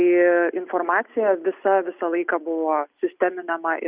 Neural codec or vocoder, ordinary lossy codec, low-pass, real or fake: none; Opus, 64 kbps; 3.6 kHz; real